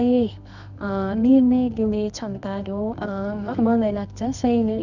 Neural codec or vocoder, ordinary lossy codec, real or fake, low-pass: codec, 24 kHz, 0.9 kbps, WavTokenizer, medium music audio release; none; fake; 7.2 kHz